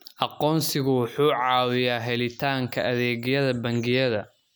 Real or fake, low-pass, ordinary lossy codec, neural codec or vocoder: real; none; none; none